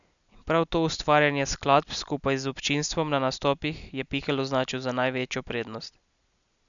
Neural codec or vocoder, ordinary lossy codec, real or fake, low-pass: none; none; real; 7.2 kHz